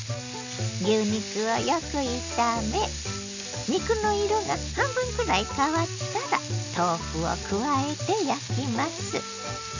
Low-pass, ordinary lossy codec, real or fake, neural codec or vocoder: 7.2 kHz; none; real; none